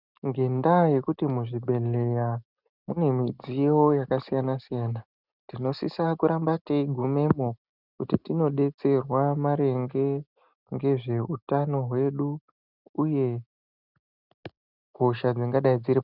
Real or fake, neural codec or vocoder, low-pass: real; none; 5.4 kHz